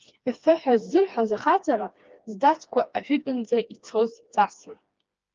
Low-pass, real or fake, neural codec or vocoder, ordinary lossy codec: 7.2 kHz; fake; codec, 16 kHz, 2 kbps, FreqCodec, smaller model; Opus, 24 kbps